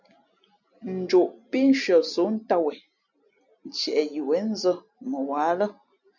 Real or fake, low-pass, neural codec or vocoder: real; 7.2 kHz; none